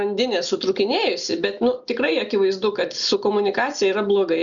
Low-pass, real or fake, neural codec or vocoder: 7.2 kHz; real; none